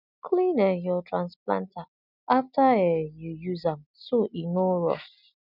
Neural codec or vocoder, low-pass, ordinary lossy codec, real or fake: none; 5.4 kHz; none; real